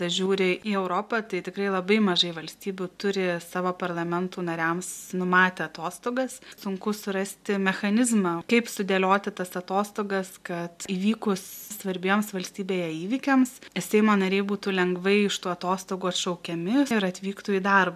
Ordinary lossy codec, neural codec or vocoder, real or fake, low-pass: MP3, 96 kbps; none; real; 14.4 kHz